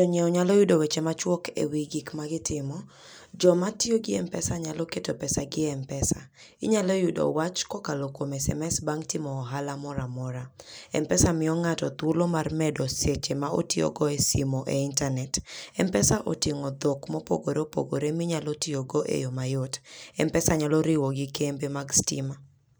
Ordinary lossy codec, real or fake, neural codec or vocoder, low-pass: none; real; none; none